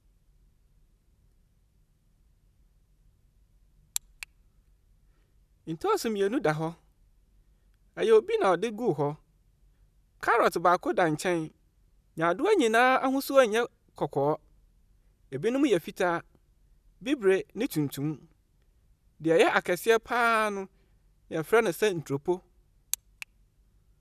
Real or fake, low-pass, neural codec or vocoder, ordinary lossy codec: real; 14.4 kHz; none; none